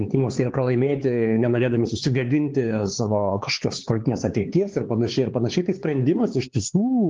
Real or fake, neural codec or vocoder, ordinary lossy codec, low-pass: fake; codec, 16 kHz, 4 kbps, X-Codec, HuBERT features, trained on LibriSpeech; Opus, 16 kbps; 7.2 kHz